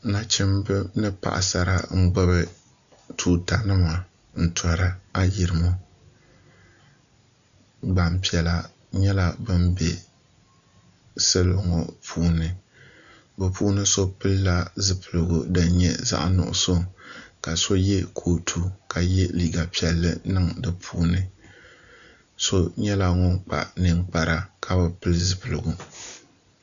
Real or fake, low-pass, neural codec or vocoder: real; 7.2 kHz; none